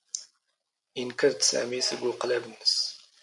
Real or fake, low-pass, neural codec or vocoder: real; 10.8 kHz; none